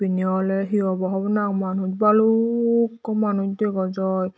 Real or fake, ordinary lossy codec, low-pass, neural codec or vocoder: real; none; none; none